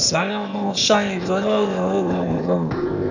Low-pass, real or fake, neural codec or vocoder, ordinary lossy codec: 7.2 kHz; fake; codec, 16 kHz, 0.8 kbps, ZipCodec; none